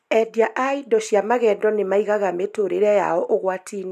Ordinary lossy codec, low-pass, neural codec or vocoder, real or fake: none; 14.4 kHz; none; real